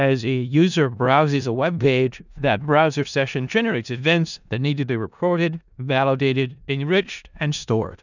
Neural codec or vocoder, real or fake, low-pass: codec, 16 kHz in and 24 kHz out, 0.4 kbps, LongCat-Audio-Codec, four codebook decoder; fake; 7.2 kHz